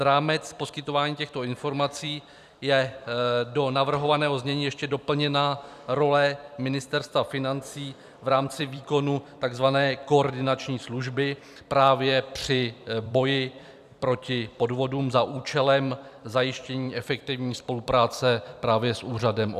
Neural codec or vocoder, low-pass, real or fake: vocoder, 44.1 kHz, 128 mel bands every 256 samples, BigVGAN v2; 14.4 kHz; fake